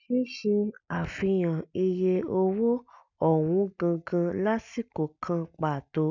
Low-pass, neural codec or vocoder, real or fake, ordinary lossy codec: 7.2 kHz; none; real; none